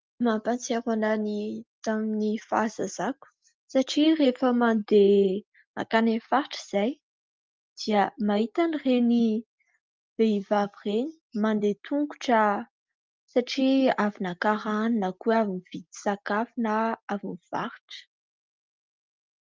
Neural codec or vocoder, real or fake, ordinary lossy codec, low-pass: none; real; Opus, 32 kbps; 7.2 kHz